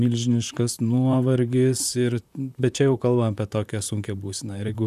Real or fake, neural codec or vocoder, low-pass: fake; vocoder, 44.1 kHz, 128 mel bands, Pupu-Vocoder; 14.4 kHz